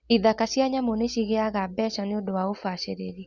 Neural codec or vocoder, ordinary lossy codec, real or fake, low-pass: none; Opus, 64 kbps; real; 7.2 kHz